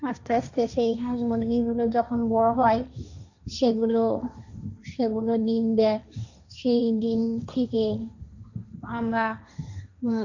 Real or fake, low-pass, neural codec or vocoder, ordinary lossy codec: fake; 7.2 kHz; codec, 16 kHz, 1.1 kbps, Voila-Tokenizer; none